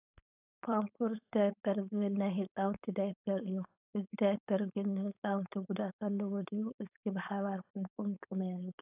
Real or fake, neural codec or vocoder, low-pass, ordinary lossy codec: fake; codec, 16 kHz, 4.8 kbps, FACodec; 3.6 kHz; AAC, 32 kbps